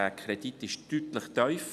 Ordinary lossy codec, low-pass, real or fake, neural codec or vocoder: none; 14.4 kHz; real; none